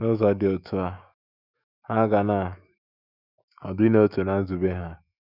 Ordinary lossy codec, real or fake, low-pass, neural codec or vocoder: none; fake; 5.4 kHz; autoencoder, 48 kHz, 128 numbers a frame, DAC-VAE, trained on Japanese speech